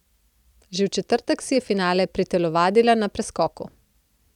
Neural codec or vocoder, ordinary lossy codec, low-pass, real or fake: vocoder, 44.1 kHz, 128 mel bands every 256 samples, BigVGAN v2; none; 19.8 kHz; fake